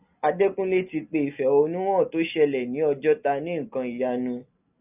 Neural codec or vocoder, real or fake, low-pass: none; real; 3.6 kHz